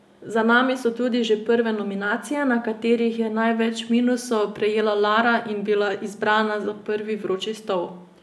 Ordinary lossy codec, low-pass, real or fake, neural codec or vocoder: none; none; real; none